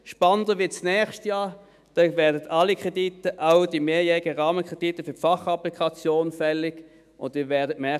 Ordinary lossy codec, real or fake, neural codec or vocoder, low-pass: none; fake; autoencoder, 48 kHz, 128 numbers a frame, DAC-VAE, trained on Japanese speech; 14.4 kHz